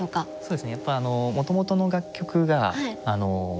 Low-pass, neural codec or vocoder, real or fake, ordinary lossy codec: none; none; real; none